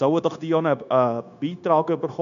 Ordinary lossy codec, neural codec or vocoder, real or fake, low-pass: none; codec, 16 kHz, 0.9 kbps, LongCat-Audio-Codec; fake; 7.2 kHz